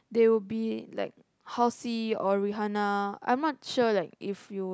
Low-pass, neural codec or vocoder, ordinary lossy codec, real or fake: none; none; none; real